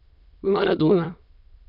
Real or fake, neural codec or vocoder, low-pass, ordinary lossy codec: fake; autoencoder, 22.05 kHz, a latent of 192 numbers a frame, VITS, trained on many speakers; 5.4 kHz; none